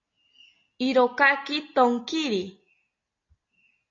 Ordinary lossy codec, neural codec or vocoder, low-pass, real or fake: AAC, 32 kbps; none; 7.2 kHz; real